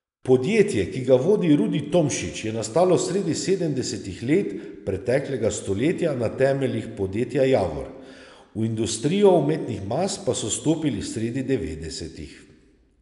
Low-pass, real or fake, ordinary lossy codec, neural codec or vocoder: 10.8 kHz; real; none; none